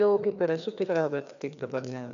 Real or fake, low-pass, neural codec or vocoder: fake; 7.2 kHz; codec, 16 kHz, 2 kbps, FreqCodec, larger model